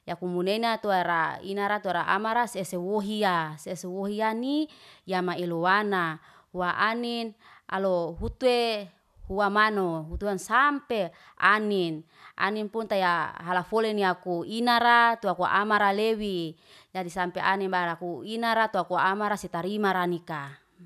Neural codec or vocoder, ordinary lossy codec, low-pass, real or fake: none; none; 14.4 kHz; real